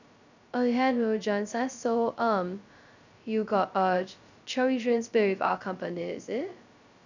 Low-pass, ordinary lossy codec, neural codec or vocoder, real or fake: 7.2 kHz; none; codec, 16 kHz, 0.2 kbps, FocalCodec; fake